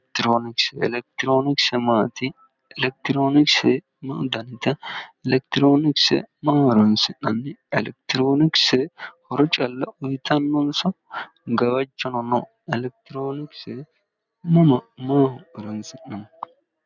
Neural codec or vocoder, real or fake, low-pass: none; real; 7.2 kHz